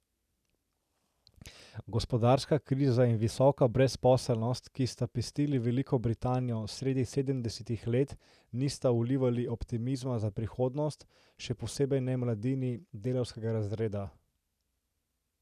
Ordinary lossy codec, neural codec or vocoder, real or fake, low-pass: none; none; real; 14.4 kHz